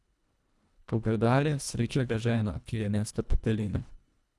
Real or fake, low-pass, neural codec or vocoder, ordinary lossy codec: fake; none; codec, 24 kHz, 1.5 kbps, HILCodec; none